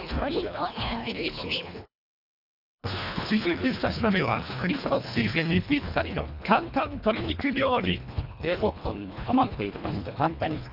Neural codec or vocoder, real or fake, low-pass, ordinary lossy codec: codec, 24 kHz, 1.5 kbps, HILCodec; fake; 5.4 kHz; none